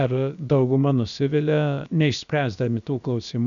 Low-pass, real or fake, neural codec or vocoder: 7.2 kHz; fake; codec, 16 kHz, about 1 kbps, DyCAST, with the encoder's durations